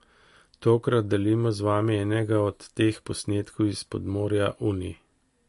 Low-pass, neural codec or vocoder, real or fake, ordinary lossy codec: 14.4 kHz; none; real; MP3, 48 kbps